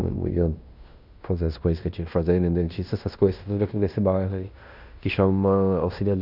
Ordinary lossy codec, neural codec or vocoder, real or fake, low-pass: none; codec, 16 kHz in and 24 kHz out, 0.9 kbps, LongCat-Audio-Codec, fine tuned four codebook decoder; fake; 5.4 kHz